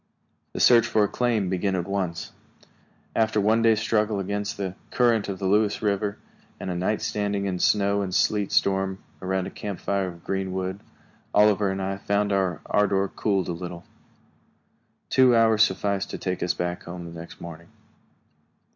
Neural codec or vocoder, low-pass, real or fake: none; 7.2 kHz; real